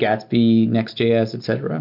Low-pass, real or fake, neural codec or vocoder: 5.4 kHz; real; none